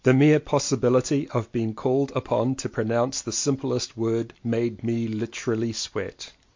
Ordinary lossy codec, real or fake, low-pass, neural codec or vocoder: MP3, 48 kbps; real; 7.2 kHz; none